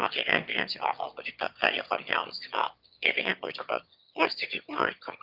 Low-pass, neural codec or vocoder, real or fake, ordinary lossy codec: 5.4 kHz; autoencoder, 22.05 kHz, a latent of 192 numbers a frame, VITS, trained on one speaker; fake; Opus, 24 kbps